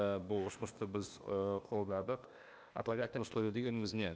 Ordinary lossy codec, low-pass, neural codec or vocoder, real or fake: none; none; codec, 16 kHz, 0.8 kbps, ZipCodec; fake